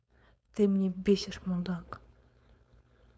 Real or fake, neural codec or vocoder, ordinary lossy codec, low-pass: fake; codec, 16 kHz, 4.8 kbps, FACodec; none; none